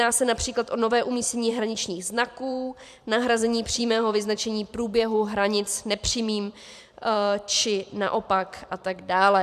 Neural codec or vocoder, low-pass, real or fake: vocoder, 44.1 kHz, 128 mel bands every 512 samples, BigVGAN v2; 14.4 kHz; fake